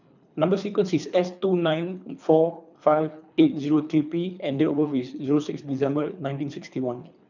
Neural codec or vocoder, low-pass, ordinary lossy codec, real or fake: codec, 24 kHz, 3 kbps, HILCodec; 7.2 kHz; none; fake